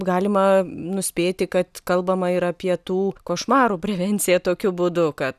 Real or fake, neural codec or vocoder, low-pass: real; none; 14.4 kHz